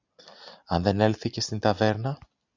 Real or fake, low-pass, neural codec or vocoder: real; 7.2 kHz; none